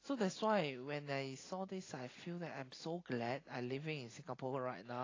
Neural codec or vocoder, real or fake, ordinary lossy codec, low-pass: none; real; AAC, 32 kbps; 7.2 kHz